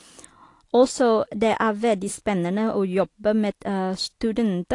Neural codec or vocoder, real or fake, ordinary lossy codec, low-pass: none; real; AAC, 48 kbps; 10.8 kHz